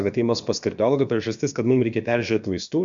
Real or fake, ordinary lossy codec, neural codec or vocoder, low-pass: fake; MP3, 64 kbps; codec, 16 kHz, about 1 kbps, DyCAST, with the encoder's durations; 7.2 kHz